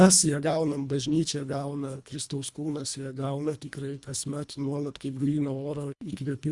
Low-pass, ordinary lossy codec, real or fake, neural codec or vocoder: 10.8 kHz; Opus, 64 kbps; fake; codec, 24 kHz, 1.5 kbps, HILCodec